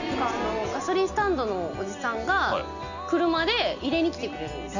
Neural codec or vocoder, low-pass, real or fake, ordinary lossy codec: none; 7.2 kHz; real; none